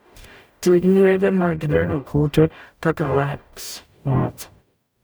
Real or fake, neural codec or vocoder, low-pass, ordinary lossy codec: fake; codec, 44.1 kHz, 0.9 kbps, DAC; none; none